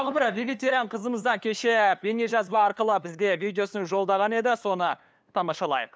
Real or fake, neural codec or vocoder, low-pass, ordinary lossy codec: fake; codec, 16 kHz, 2 kbps, FunCodec, trained on LibriTTS, 25 frames a second; none; none